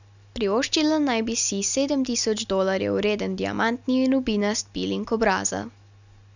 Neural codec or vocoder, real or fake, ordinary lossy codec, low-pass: none; real; none; 7.2 kHz